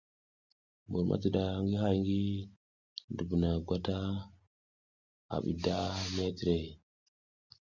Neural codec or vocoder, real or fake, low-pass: none; real; 7.2 kHz